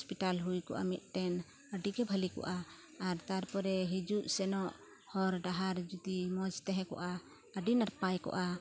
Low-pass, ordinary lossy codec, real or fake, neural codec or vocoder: none; none; real; none